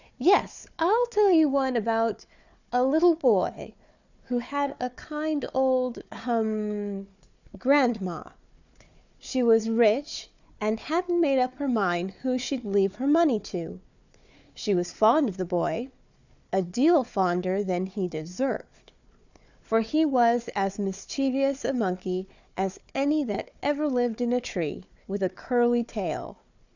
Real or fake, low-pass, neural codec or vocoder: fake; 7.2 kHz; codec, 16 kHz, 4 kbps, FunCodec, trained on Chinese and English, 50 frames a second